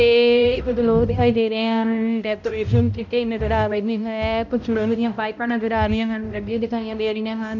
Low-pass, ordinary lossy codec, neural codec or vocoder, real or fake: 7.2 kHz; none; codec, 16 kHz, 0.5 kbps, X-Codec, HuBERT features, trained on balanced general audio; fake